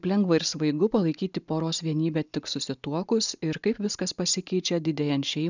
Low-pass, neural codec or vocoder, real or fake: 7.2 kHz; none; real